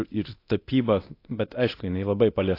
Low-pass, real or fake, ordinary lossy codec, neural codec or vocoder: 5.4 kHz; fake; AAC, 32 kbps; codec, 24 kHz, 0.9 kbps, DualCodec